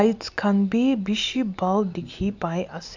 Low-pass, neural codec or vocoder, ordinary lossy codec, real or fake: 7.2 kHz; none; none; real